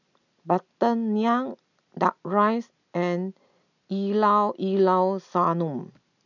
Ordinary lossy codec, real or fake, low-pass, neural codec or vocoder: none; real; 7.2 kHz; none